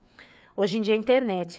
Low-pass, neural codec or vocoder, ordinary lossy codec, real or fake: none; codec, 16 kHz, 4 kbps, FreqCodec, larger model; none; fake